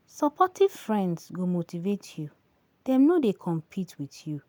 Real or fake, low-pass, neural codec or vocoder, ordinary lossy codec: real; none; none; none